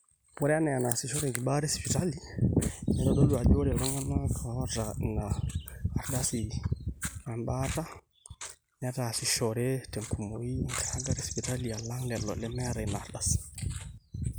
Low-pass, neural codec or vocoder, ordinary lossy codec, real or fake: none; none; none; real